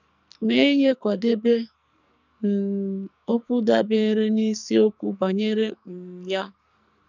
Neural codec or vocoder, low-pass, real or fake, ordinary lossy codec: codec, 44.1 kHz, 2.6 kbps, SNAC; 7.2 kHz; fake; none